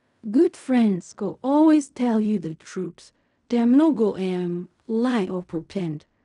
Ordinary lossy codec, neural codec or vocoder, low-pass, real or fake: none; codec, 16 kHz in and 24 kHz out, 0.4 kbps, LongCat-Audio-Codec, fine tuned four codebook decoder; 10.8 kHz; fake